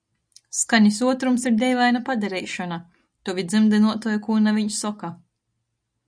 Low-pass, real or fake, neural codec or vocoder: 9.9 kHz; real; none